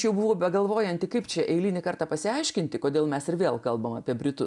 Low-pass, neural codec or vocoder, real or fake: 10.8 kHz; none; real